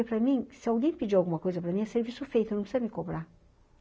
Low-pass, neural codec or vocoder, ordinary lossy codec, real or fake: none; none; none; real